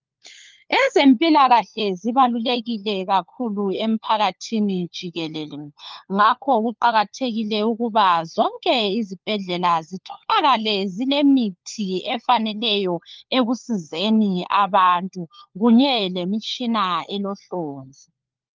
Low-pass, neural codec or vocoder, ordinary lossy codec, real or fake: 7.2 kHz; codec, 16 kHz, 4 kbps, FunCodec, trained on LibriTTS, 50 frames a second; Opus, 24 kbps; fake